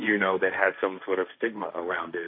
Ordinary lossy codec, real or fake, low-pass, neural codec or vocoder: MP3, 32 kbps; fake; 5.4 kHz; codec, 16 kHz, 1.1 kbps, Voila-Tokenizer